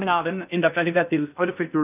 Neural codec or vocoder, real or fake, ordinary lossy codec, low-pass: codec, 16 kHz in and 24 kHz out, 0.6 kbps, FocalCodec, streaming, 2048 codes; fake; none; 3.6 kHz